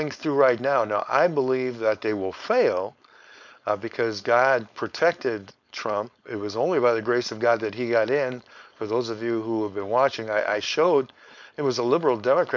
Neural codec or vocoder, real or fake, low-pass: codec, 16 kHz, 4.8 kbps, FACodec; fake; 7.2 kHz